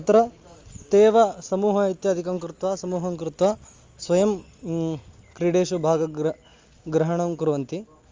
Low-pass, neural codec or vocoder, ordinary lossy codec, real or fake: 7.2 kHz; none; Opus, 32 kbps; real